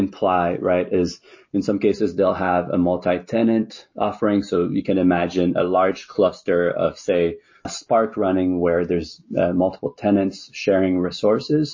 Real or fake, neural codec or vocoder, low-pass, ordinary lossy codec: real; none; 7.2 kHz; MP3, 32 kbps